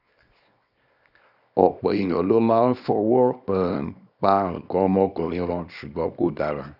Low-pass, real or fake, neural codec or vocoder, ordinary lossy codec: 5.4 kHz; fake; codec, 24 kHz, 0.9 kbps, WavTokenizer, small release; none